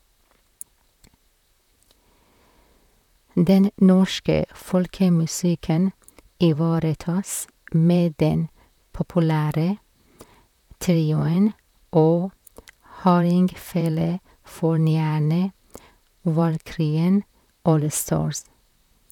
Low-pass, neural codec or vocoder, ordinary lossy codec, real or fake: 19.8 kHz; vocoder, 44.1 kHz, 128 mel bands, Pupu-Vocoder; none; fake